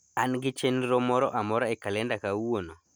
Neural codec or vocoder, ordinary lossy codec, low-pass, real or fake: none; none; none; real